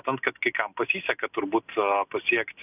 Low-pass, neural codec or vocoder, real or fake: 3.6 kHz; none; real